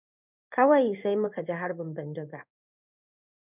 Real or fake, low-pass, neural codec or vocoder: fake; 3.6 kHz; codec, 16 kHz in and 24 kHz out, 1 kbps, XY-Tokenizer